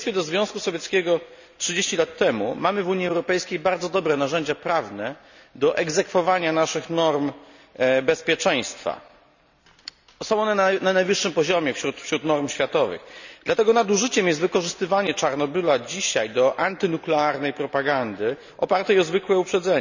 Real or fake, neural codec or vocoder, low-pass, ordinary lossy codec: real; none; 7.2 kHz; none